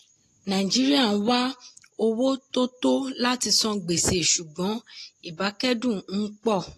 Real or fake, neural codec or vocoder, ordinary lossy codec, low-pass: real; none; AAC, 48 kbps; 14.4 kHz